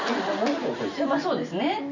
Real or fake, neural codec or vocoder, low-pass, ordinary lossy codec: real; none; 7.2 kHz; none